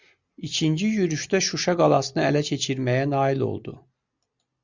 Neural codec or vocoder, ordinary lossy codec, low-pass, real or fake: none; Opus, 64 kbps; 7.2 kHz; real